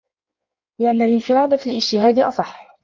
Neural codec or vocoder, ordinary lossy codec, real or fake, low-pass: codec, 16 kHz in and 24 kHz out, 1.1 kbps, FireRedTTS-2 codec; MP3, 48 kbps; fake; 7.2 kHz